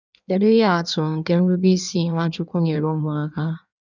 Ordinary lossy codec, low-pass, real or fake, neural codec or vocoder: none; 7.2 kHz; fake; codec, 16 kHz in and 24 kHz out, 1.1 kbps, FireRedTTS-2 codec